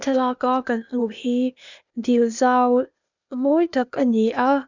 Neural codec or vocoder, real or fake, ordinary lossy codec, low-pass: codec, 16 kHz, 0.8 kbps, ZipCodec; fake; none; 7.2 kHz